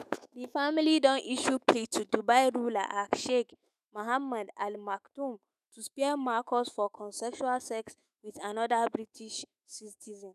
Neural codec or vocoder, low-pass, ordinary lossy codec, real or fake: autoencoder, 48 kHz, 128 numbers a frame, DAC-VAE, trained on Japanese speech; 14.4 kHz; none; fake